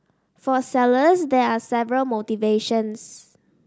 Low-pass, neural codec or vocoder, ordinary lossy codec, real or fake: none; none; none; real